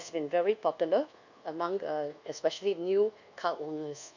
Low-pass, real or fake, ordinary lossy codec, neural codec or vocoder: 7.2 kHz; fake; none; codec, 24 kHz, 1.2 kbps, DualCodec